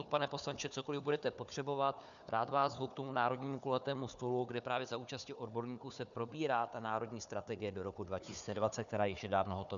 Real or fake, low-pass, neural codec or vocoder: fake; 7.2 kHz; codec, 16 kHz, 4 kbps, FunCodec, trained on Chinese and English, 50 frames a second